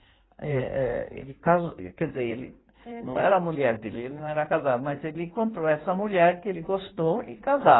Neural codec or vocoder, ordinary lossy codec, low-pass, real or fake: codec, 16 kHz in and 24 kHz out, 1.1 kbps, FireRedTTS-2 codec; AAC, 16 kbps; 7.2 kHz; fake